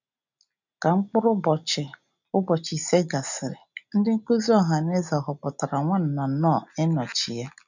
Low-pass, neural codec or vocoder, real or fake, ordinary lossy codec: 7.2 kHz; none; real; none